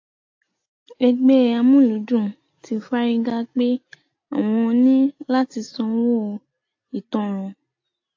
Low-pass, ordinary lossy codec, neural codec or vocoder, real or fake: 7.2 kHz; AAC, 48 kbps; none; real